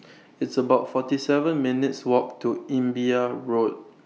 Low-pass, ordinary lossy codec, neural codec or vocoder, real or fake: none; none; none; real